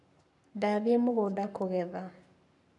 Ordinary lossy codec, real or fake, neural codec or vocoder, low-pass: none; fake; codec, 44.1 kHz, 7.8 kbps, Pupu-Codec; 10.8 kHz